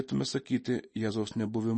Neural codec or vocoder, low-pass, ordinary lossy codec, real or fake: none; 9.9 kHz; MP3, 32 kbps; real